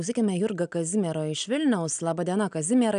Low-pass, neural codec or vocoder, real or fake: 9.9 kHz; none; real